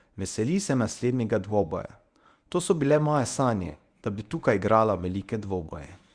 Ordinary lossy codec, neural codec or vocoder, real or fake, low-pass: Opus, 64 kbps; codec, 24 kHz, 0.9 kbps, WavTokenizer, medium speech release version 1; fake; 9.9 kHz